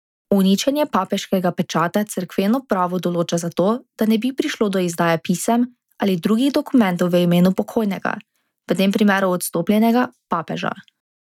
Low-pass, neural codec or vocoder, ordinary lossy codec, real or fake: 19.8 kHz; none; none; real